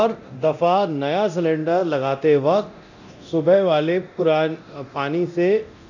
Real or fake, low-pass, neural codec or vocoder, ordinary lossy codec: fake; 7.2 kHz; codec, 24 kHz, 0.9 kbps, DualCodec; none